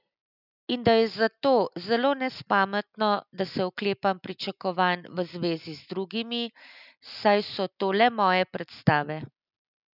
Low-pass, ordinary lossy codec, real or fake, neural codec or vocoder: 5.4 kHz; none; real; none